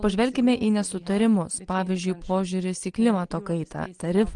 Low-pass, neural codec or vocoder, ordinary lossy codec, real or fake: 9.9 kHz; none; Opus, 24 kbps; real